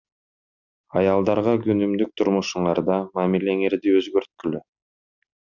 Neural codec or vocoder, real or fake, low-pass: none; real; 7.2 kHz